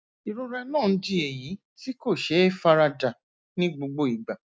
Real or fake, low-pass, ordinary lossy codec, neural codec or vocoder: real; none; none; none